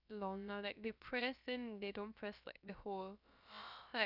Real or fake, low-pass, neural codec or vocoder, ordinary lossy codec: fake; 5.4 kHz; codec, 16 kHz, about 1 kbps, DyCAST, with the encoder's durations; none